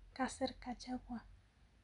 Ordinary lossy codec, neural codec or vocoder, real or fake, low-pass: none; none; real; 10.8 kHz